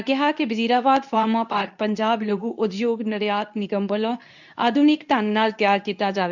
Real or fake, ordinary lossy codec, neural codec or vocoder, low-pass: fake; none; codec, 24 kHz, 0.9 kbps, WavTokenizer, medium speech release version 2; 7.2 kHz